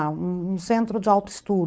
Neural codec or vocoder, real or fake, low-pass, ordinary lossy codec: codec, 16 kHz, 4.8 kbps, FACodec; fake; none; none